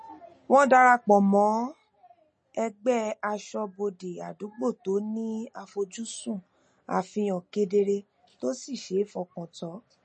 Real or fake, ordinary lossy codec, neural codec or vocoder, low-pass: real; MP3, 32 kbps; none; 10.8 kHz